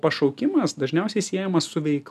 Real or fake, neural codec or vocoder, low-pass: real; none; 14.4 kHz